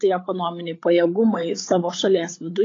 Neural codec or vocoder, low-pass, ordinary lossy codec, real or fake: codec, 16 kHz, 8 kbps, FreqCodec, larger model; 7.2 kHz; AAC, 32 kbps; fake